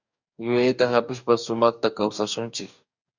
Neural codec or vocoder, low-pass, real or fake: codec, 44.1 kHz, 2.6 kbps, DAC; 7.2 kHz; fake